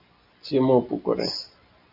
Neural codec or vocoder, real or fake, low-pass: none; real; 5.4 kHz